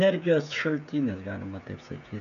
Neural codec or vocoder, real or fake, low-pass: codec, 16 kHz, 16 kbps, FreqCodec, smaller model; fake; 7.2 kHz